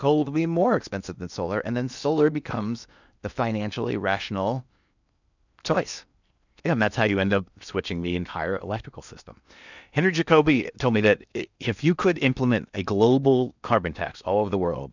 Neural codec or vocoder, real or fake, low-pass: codec, 16 kHz in and 24 kHz out, 0.8 kbps, FocalCodec, streaming, 65536 codes; fake; 7.2 kHz